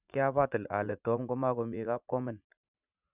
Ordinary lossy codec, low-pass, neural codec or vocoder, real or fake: none; 3.6 kHz; vocoder, 44.1 kHz, 80 mel bands, Vocos; fake